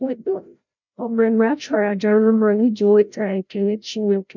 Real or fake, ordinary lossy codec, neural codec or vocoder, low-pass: fake; none; codec, 16 kHz, 0.5 kbps, FreqCodec, larger model; 7.2 kHz